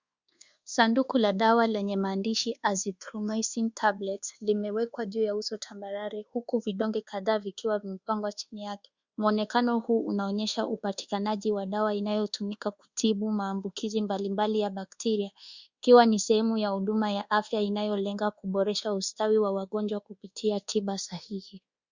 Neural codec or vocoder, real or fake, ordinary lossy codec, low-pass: codec, 24 kHz, 1.2 kbps, DualCodec; fake; Opus, 64 kbps; 7.2 kHz